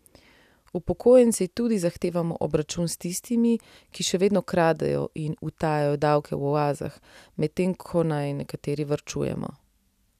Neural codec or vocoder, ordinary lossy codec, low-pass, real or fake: none; none; 14.4 kHz; real